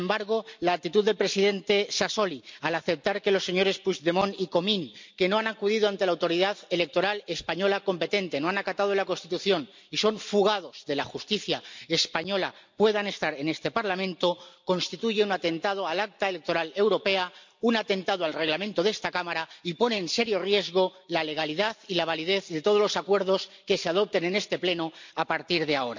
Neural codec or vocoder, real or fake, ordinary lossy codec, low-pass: none; real; MP3, 64 kbps; 7.2 kHz